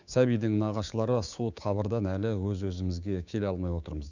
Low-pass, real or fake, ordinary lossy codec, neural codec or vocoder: 7.2 kHz; fake; none; codec, 16 kHz, 6 kbps, DAC